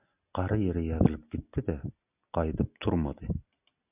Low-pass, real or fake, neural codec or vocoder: 3.6 kHz; real; none